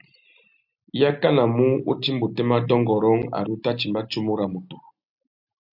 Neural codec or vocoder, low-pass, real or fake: none; 5.4 kHz; real